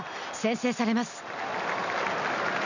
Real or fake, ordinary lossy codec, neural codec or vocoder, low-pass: real; none; none; 7.2 kHz